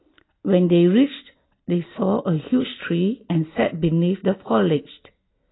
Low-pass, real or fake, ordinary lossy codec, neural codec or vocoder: 7.2 kHz; real; AAC, 16 kbps; none